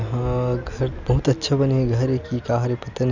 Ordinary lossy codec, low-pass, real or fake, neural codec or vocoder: none; 7.2 kHz; real; none